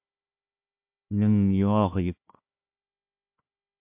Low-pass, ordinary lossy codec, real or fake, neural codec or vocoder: 3.6 kHz; MP3, 32 kbps; fake; codec, 16 kHz, 1 kbps, FunCodec, trained on Chinese and English, 50 frames a second